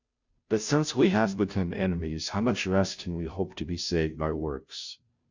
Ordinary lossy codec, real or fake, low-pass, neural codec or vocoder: Opus, 64 kbps; fake; 7.2 kHz; codec, 16 kHz, 0.5 kbps, FunCodec, trained on Chinese and English, 25 frames a second